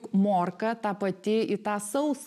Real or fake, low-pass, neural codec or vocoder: real; 14.4 kHz; none